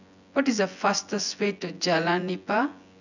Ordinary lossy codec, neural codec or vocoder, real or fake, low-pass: none; vocoder, 24 kHz, 100 mel bands, Vocos; fake; 7.2 kHz